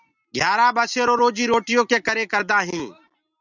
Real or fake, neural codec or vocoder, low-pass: real; none; 7.2 kHz